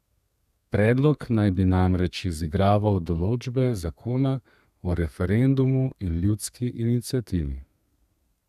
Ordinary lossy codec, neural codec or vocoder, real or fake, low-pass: none; codec, 32 kHz, 1.9 kbps, SNAC; fake; 14.4 kHz